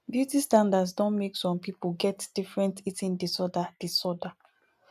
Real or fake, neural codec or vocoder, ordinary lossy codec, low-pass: real; none; none; 14.4 kHz